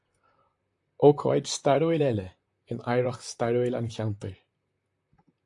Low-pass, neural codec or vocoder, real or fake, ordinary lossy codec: 10.8 kHz; vocoder, 44.1 kHz, 128 mel bands, Pupu-Vocoder; fake; AAC, 64 kbps